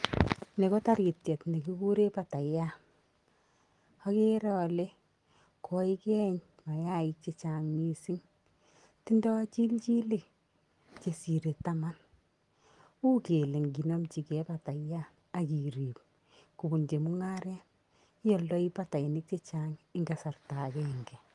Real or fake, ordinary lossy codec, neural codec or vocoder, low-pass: real; Opus, 24 kbps; none; 10.8 kHz